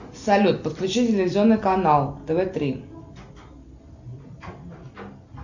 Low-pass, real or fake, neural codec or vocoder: 7.2 kHz; real; none